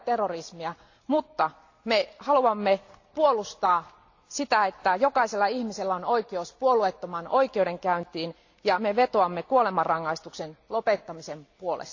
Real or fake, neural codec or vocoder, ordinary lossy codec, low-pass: real; none; AAC, 48 kbps; 7.2 kHz